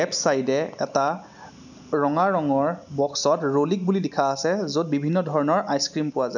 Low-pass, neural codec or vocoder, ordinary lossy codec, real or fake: 7.2 kHz; none; none; real